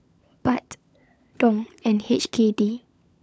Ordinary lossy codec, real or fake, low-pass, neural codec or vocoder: none; fake; none; codec, 16 kHz, 16 kbps, FunCodec, trained on LibriTTS, 50 frames a second